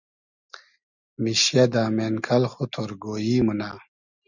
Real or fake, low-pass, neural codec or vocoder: real; 7.2 kHz; none